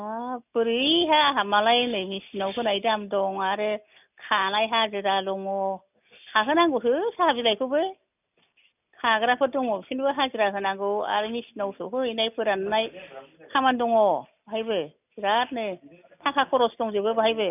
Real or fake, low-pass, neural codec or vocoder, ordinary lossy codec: real; 3.6 kHz; none; none